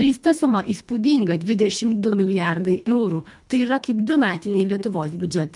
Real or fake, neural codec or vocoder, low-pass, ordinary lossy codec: fake; codec, 24 kHz, 1.5 kbps, HILCodec; 10.8 kHz; MP3, 96 kbps